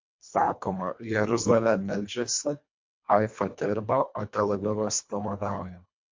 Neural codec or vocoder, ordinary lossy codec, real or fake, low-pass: codec, 24 kHz, 1.5 kbps, HILCodec; MP3, 48 kbps; fake; 7.2 kHz